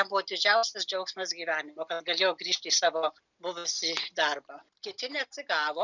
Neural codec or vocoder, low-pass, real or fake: none; 7.2 kHz; real